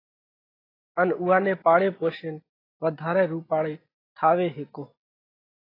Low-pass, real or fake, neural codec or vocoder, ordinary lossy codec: 5.4 kHz; real; none; AAC, 24 kbps